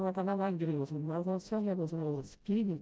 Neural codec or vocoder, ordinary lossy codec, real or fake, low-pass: codec, 16 kHz, 0.5 kbps, FreqCodec, smaller model; none; fake; none